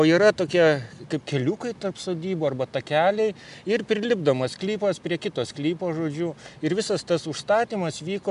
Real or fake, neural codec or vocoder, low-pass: real; none; 10.8 kHz